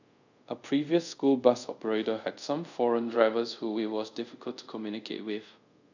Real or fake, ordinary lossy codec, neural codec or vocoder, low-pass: fake; none; codec, 24 kHz, 0.5 kbps, DualCodec; 7.2 kHz